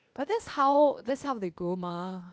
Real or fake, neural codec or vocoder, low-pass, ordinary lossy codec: fake; codec, 16 kHz, 0.8 kbps, ZipCodec; none; none